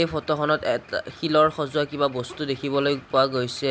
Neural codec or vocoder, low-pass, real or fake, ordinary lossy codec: none; none; real; none